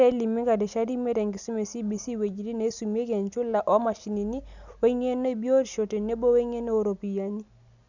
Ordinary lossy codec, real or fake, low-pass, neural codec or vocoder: none; real; 7.2 kHz; none